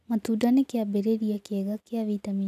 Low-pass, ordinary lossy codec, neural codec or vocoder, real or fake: 14.4 kHz; none; none; real